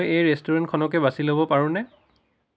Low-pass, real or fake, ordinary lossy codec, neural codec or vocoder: none; real; none; none